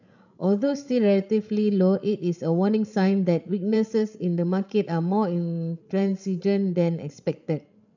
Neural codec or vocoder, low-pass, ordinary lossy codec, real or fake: codec, 16 kHz, 16 kbps, FreqCodec, larger model; 7.2 kHz; none; fake